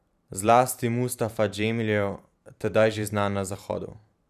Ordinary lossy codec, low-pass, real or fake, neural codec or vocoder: none; 14.4 kHz; fake; vocoder, 44.1 kHz, 128 mel bands every 512 samples, BigVGAN v2